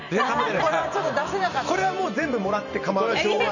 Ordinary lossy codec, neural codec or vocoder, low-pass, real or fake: none; vocoder, 44.1 kHz, 128 mel bands every 512 samples, BigVGAN v2; 7.2 kHz; fake